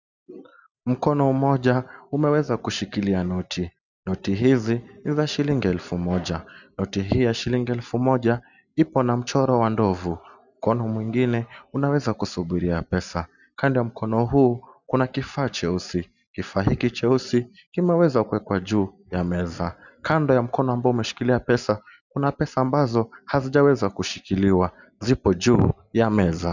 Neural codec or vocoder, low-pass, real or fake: none; 7.2 kHz; real